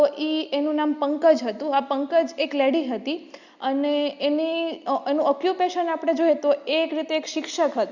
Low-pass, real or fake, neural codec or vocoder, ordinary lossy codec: 7.2 kHz; real; none; Opus, 64 kbps